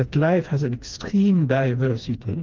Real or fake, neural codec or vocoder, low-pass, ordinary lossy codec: fake; codec, 16 kHz, 2 kbps, FreqCodec, smaller model; 7.2 kHz; Opus, 32 kbps